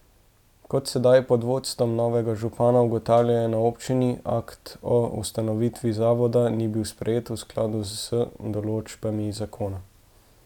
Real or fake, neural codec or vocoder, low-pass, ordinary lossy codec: real; none; 19.8 kHz; none